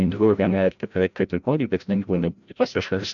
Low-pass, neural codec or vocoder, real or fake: 7.2 kHz; codec, 16 kHz, 0.5 kbps, FreqCodec, larger model; fake